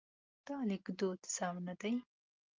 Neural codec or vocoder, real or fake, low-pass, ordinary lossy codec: none; real; 7.2 kHz; Opus, 24 kbps